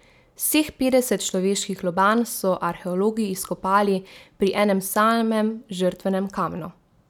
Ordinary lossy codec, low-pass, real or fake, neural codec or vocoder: none; 19.8 kHz; real; none